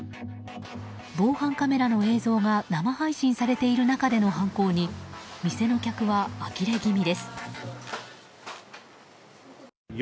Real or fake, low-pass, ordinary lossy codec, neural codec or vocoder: real; none; none; none